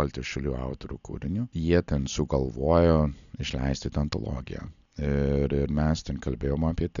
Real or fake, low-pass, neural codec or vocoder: real; 7.2 kHz; none